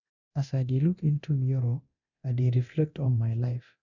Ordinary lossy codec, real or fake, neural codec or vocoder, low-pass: none; fake; codec, 24 kHz, 0.9 kbps, DualCodec; 7.2 kHz